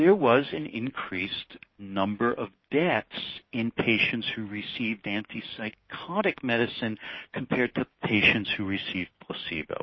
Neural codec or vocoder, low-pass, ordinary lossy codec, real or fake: codec, 16 kHz, 6 kbps, DAC; 7.2 kHz; MP3, 24 kbps; fake